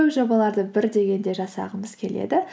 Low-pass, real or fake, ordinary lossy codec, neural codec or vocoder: none; real; none; none